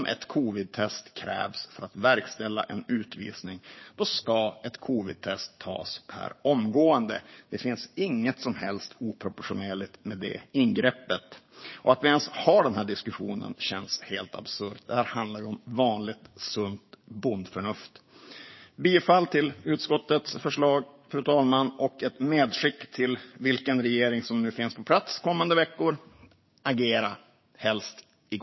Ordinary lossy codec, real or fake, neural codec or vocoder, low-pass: MP3, 24 kbps; fake; codec, 16 kHz, 6 kbps, DAC; 7.2 kHz